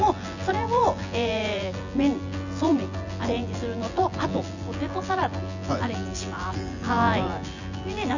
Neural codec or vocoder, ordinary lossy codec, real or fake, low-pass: vocoder, 24 kHz, 100 mel bands, Vocos; none; fake; 7.2 kHz